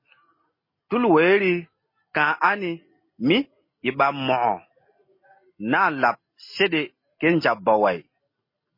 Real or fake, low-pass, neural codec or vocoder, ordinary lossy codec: real; 5.4 kHz; none; MP3, 24 kbps